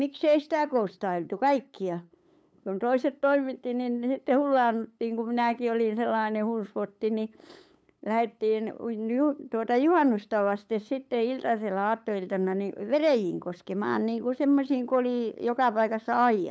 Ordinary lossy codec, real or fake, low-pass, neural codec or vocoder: none; fake; none; codec, 16 kHz, 8 kbps, FunCodec, trained on LibriTTS, 25 frames a second